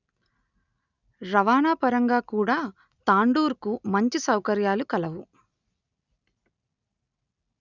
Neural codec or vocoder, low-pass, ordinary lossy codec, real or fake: none; 7.2 kHz; none; real